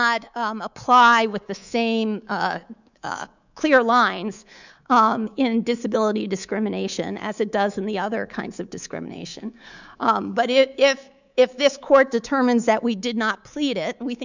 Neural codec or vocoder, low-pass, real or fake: codec, 24 kHz, 3.1 kbps, DualCodec; 7.2 kHz; fake